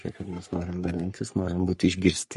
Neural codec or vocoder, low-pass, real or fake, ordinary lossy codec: codec, 44.1 kHz, 3.4 kbps, Pupu-Codec; 14.4 kHz; fake; MP3, 48 kbps